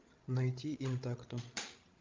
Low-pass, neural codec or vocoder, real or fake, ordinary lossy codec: 7.2 kHz; codec, 16 kHz, 16 kbps, FreqCodec, larger model; fake; Opus, 32 kbps